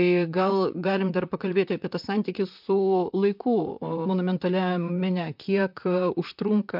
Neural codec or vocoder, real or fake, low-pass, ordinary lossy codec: vocoder, 44.1 kHz, 128 mel bands, Pupu-Vocoder; fake; 5.4 kHz; MP3, 48 kbps